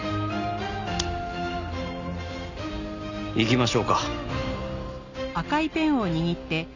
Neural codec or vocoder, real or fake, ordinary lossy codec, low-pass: none; real; none; 7.2 kHz